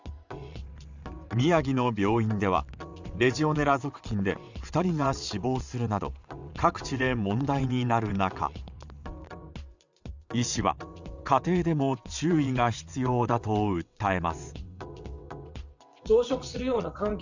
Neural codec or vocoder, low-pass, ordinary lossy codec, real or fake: vocoder, 22.05 kHz, 80 mel bands, WaveNeXt; 7.2 kHz; Opus, 64 kbps; fake